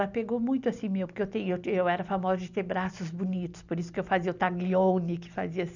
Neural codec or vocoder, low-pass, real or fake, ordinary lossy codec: none; 7.2 kHz; real; none